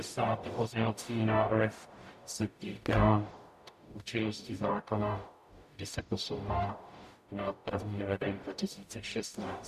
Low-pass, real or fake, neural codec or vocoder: 14.4 kHz; fake; codec, 44.1 kHz, 0.9 kbps, DAC